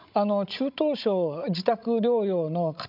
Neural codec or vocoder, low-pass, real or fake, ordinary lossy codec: codec, 16 kHz, 16 kbps, FreqCodec, larger model; 5.4 kHz; fake; none